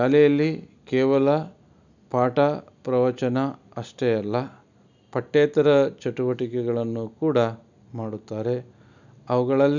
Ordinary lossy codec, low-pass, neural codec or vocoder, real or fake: none; 7.2 kHz; none; real